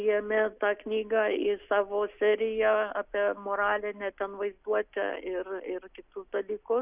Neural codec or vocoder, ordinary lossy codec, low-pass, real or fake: none; Opus, 64 kbps; 3.6 kHz; real